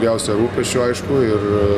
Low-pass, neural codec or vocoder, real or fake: 14.4 kHz; none; real